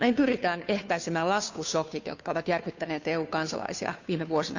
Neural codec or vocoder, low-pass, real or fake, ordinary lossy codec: codec, 16 kHz, 2 kbps, FunCodec, trained on Chinese and English, 25 frames a second; 7.2 kHz; fake; none